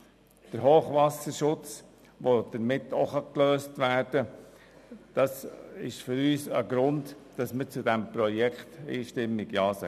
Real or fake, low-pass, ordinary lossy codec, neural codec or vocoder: real; 14.4 kHz; none; none